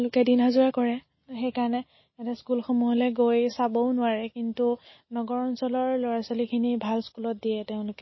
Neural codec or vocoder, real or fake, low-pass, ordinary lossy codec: none; real; 7.2 kHz; MP3, 24 kbps